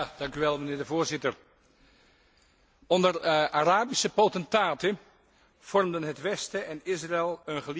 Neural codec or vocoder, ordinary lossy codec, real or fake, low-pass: none; none; real; none